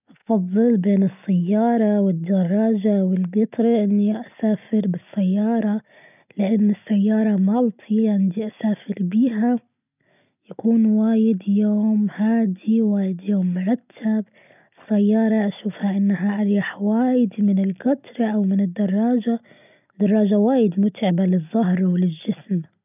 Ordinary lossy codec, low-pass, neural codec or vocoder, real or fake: none; 3.6 kHz; none; real